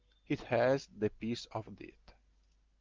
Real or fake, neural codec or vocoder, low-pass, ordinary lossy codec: real; none; 7.2 kHz; Opus, 32 kbps